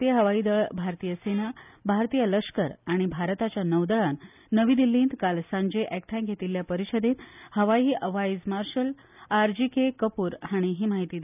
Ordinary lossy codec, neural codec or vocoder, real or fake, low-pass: none; none; real; 3.6 kHz